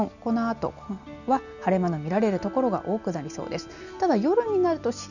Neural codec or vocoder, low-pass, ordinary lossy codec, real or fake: none; 7.2 kHz; none; real